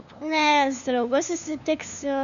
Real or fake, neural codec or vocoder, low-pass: fake; codec, 16 kHz, 2 kbps, FunCodec, trained on LibriTTS, 25 frames a second; 7.2 kHz